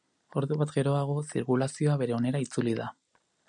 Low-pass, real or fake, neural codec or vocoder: 9.9 kHz; real; none